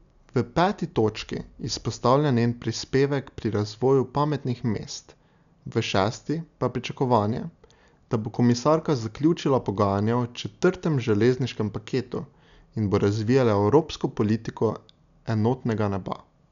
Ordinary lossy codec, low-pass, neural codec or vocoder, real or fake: none; 7.2 kHz; none; real